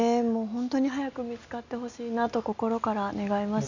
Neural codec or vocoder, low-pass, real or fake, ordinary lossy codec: none; 7.2 kHz; real; none